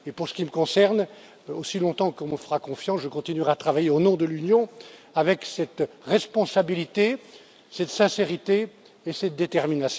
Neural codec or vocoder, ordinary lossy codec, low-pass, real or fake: none; none; none; real